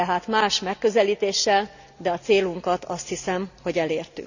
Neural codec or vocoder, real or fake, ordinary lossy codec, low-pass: none; real; none; 7.2 kHz